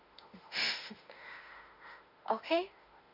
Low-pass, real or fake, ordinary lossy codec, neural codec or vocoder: 5.4 kHz; fake; none; autoencoder, 48 kHz, 32 numbers a frame, DAC-VAE, trained on Japanese speech